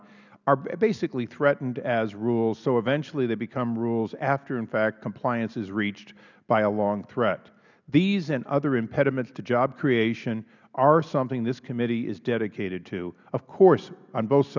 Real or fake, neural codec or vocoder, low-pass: real; none; 7.2 kHz